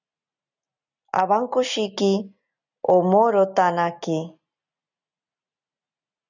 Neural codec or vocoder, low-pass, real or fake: none; 7.2 kHz; real